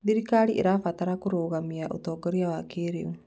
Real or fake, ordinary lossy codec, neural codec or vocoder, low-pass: real; none; none; none